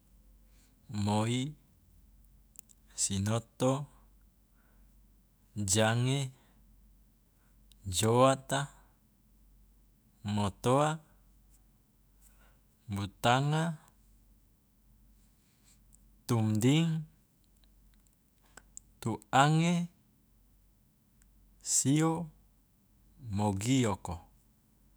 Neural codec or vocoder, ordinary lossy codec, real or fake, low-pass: autoencoder, 48 kHz, 128 numbers a frame, DAC-VAE, trained on Japanese speech; none; fake; none